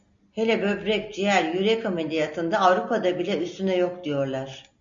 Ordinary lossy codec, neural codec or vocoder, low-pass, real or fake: MP3, 48 kbps; none; 7.2 kHz; real